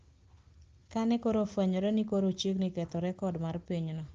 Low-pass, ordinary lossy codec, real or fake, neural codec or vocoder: 7.2 kHz; Opus, 16 kbps; real; none